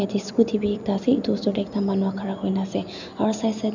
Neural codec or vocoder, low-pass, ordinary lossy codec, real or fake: none; 7.2 kHz; none; real